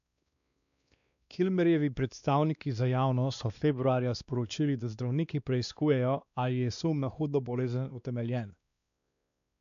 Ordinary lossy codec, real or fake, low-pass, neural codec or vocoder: none; fake; 7.2 kHz; codec, 16 kHz, 2 kbps, X-Codec, WavLM features, trained on Multilingual LibriSpeech